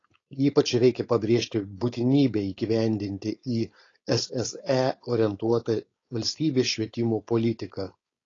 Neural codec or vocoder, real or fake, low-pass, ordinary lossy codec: codec, 16 kHz, 4.8 kbps, FACodec; fake; 7.2 kHz; AAC, 32 kbps